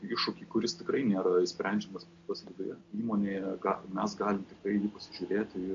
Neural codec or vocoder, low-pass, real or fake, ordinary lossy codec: none; 7.2 kHz; real; MP3, 48 kbps